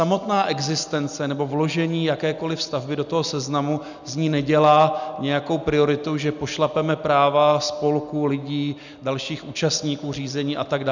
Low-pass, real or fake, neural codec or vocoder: 7.2 kHz; real; none